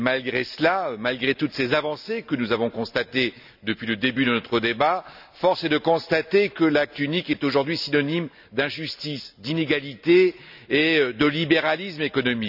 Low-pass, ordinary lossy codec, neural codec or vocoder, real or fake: 5.4 kHz; none; none; real